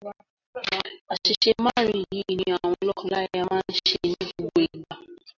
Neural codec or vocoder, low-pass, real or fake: none; 7.2 kHz; real